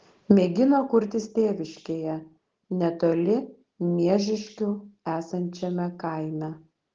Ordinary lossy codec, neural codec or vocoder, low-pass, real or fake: Opus, 16 kbps; none; 7.2 kHz; real